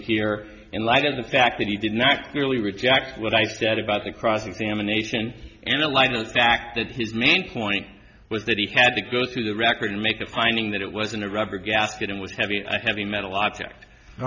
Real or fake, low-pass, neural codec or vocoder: real; 7.2 kHz; none